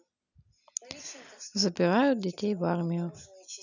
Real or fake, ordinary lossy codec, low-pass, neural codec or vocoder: real; none; 7.2 kHz; none